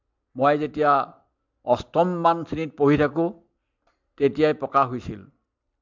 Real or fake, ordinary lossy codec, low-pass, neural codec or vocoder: real; MP3, 64 kbps; 7.2 kHz; none